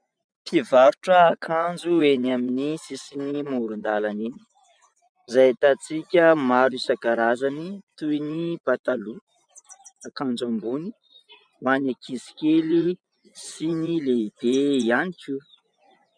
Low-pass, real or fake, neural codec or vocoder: 9.9 kHz; fake; vocoder, 24 kHz, 100 mel bands, Vocos